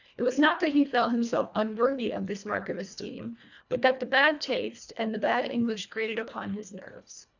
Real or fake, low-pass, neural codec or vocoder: fake; 7.2 kHz; codec, 24 kHz, 1.5 kbps, HILCodec